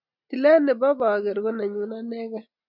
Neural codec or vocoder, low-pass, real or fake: none; 5.4 kHz; real